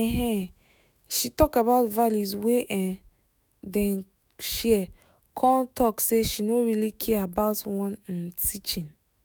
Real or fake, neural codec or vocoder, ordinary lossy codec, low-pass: fake; autoencoder, 48 kHz, 128 numbers a frame, DAC-VAE, trained on Japanese speech; none; none